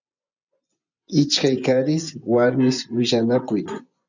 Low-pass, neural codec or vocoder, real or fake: 7.2 kHz; vocoder, 24 kHz, 100 mel bands, Vocos; fake